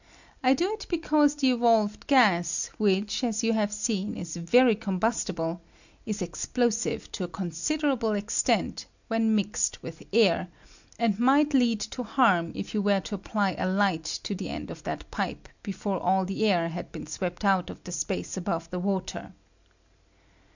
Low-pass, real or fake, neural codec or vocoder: 7.2 kHz; real; none